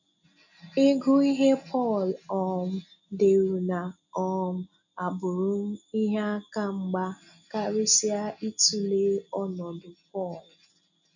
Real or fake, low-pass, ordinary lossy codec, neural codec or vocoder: real; 7.2 kHz; none; none